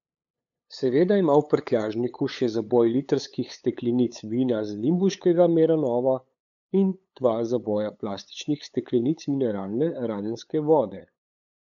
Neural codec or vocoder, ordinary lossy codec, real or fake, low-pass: codec, 16 kHz, 8 kbps, FunCodec, trained on LibriTTS, 25 frames a second; none; fake; 7.2 kHz